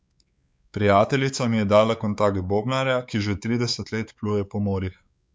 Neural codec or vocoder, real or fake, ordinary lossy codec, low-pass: codec, 16 kHz, 4 kbps, X-Codec, WavLM features, trained on Multilingual LibriSpeech; fake; none; none